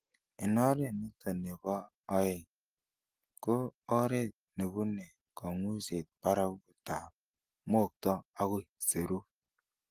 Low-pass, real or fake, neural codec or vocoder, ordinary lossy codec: 14.4 kHz; real; none; Opus, 24 kbps